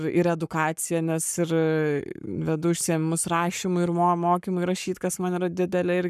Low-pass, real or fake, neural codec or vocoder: 14.4 kHz; fake; codec, 44.1 kHz, 7.8 kbps, Pupu-Codec